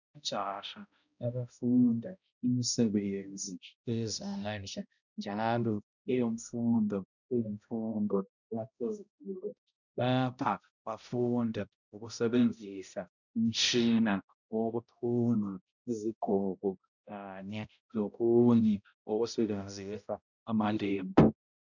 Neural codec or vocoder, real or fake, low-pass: codec, 16 kHz, 0.5 kbps, X-Codec, HuBERT features, trained on balanced general audio; fake; 7.2 kHz